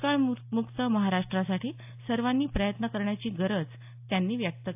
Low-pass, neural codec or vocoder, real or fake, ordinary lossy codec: 3.6 kHz; none; real; AAC, 32 kbps